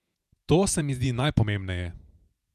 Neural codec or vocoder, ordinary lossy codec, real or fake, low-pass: vocoder, 48 kHz, 128 mel bands, Vocos; none; fake; 14.4 kHz